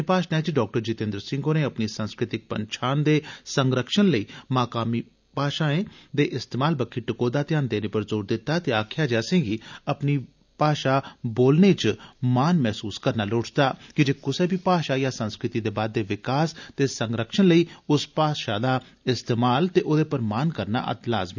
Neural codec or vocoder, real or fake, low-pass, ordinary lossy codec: none; real; 7.2 kHz; none